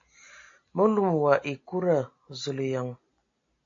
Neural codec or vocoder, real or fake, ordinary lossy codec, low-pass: none; real; AAC, 48 kbps; 7.2 kHz